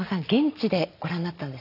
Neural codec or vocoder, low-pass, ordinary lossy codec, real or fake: none; 5.4 kHz; none; real